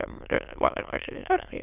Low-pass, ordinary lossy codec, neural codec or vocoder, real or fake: 3.6 kHz; none; autoencoder, 22.05 kHz, a latent of 192 numbers a frame, VITS, trained on many speakers; fake